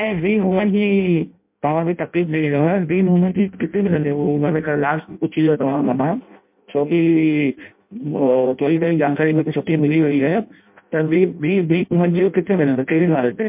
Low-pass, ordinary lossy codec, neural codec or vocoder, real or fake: 3.6 kHz; MP3, 32 kbps; codec, 16 kHz in and 24 kHz out, 0.6 kbps, FireRedTTS-2 codec; fake